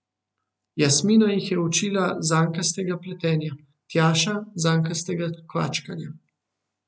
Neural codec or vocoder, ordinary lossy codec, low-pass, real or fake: none; none; none; real